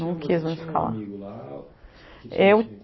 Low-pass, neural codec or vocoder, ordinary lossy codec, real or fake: 7.2 kHz; none; MP3, 24 kbps; real